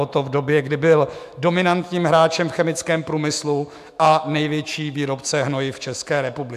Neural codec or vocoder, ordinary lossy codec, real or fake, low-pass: autoencoder, 48 kHz, 128 numbers a frame, DAC-VAE, trained on Japanese speech; AAC, 96 kbps; fake; 14.4 kHz